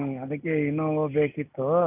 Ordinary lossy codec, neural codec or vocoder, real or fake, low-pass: none; none; real; 3.6 kHz